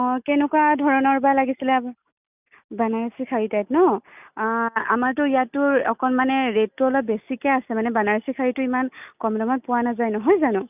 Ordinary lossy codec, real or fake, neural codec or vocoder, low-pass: none; real; none; 3.6 kHz